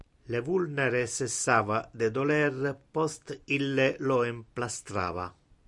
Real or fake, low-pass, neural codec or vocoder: real; 10.8 kHz; none